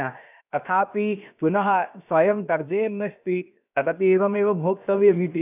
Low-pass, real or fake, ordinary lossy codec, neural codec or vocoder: 3.6 kHz; fake; none; codec, 16 kHz, about 1 kbps, DyCAST, with the encoder's durations